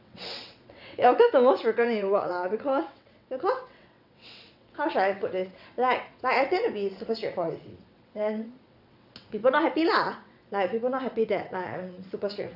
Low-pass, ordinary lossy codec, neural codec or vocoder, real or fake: 5.4 kHz; none; vocoder, 22.05 kHz, 80 mel bands, WaveNeXt; fake